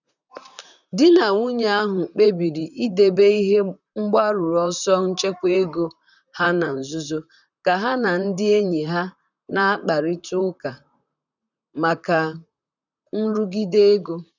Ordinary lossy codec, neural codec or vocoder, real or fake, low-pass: none; vocoder, 44.1 kHz, 128 mel bands, Pupu-Vocoder; fake; 7.2 kHz